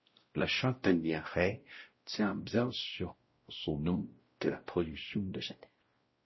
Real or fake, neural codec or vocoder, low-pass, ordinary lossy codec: fake; codec, 16 kHz, 0.5 kbps, X-Codec, WavLM features, trained on Multilingual LibriSpeech; 7.2 kHz; MP3, 24 kbps